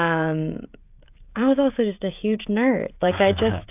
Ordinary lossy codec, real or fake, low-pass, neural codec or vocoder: AAC, 32 kbps; fake; 3.6 kHz; codec, 16 kHz, 16 kbps, FreqCodec, smaller model